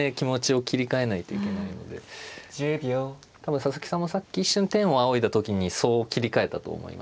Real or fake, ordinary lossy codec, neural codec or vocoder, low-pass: real; none; none; none